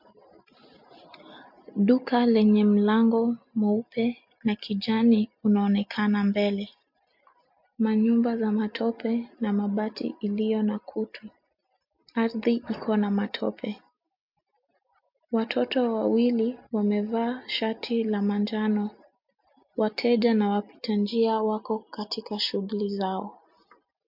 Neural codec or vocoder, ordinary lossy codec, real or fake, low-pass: none; MP3, 48 kbps; real; 5.4 kHz